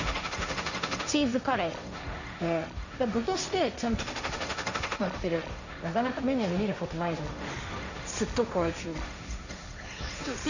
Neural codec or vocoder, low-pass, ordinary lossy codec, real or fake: codec, 16 kHz, 1.1 kbps, Voila-Tokenizer; 7.2 kHz; none; fake